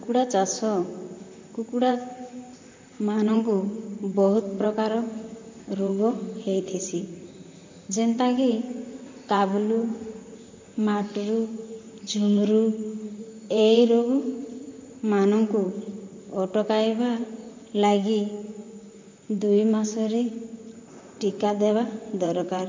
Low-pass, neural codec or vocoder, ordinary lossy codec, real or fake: 7.2 kHz; vocoder, 44.1 kHz, 128 mel bands, Pupu-Vocoder; MP3, 48 kbps; fake